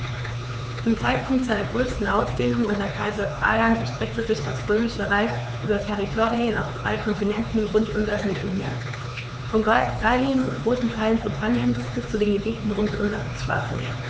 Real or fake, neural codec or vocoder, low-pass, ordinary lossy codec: fake; codec, 16 kHz, 4 kbps, X-Codec, HuBERT features, trained on LibriSpeech; none; none